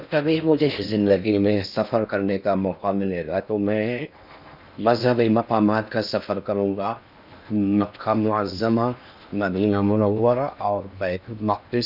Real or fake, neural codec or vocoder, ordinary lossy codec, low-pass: fake; codec, 16 kHz in and 24 kHz out, 0.6 kbps, FocalCodec, streaming, 4096 codes; none; 5.4 kHz